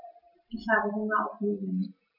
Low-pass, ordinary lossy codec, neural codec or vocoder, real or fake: 5.4 kHz; none; none; real